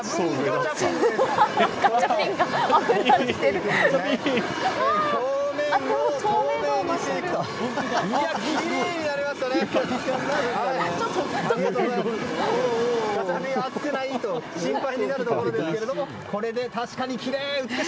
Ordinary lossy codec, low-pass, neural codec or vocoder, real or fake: none; none; none; real